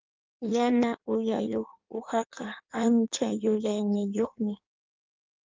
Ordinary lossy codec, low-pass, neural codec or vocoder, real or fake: Opus, 32 kbps; 7.2 kHz; codec, 16 kHz in and 24 kHz out, 1.1 kbps, FireRedTTS-2 codec; fake